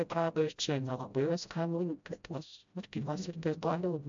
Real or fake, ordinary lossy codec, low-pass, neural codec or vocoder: fake; MP3, 64 kbps; 7.2 kHz; codec, 16 kHz, 0.5 kbps, FreqCodec, smaller model